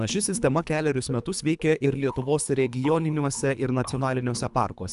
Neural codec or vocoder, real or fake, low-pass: codec, 24 kHz, 3 kbps, HILCodec; fake; 10.8 kHz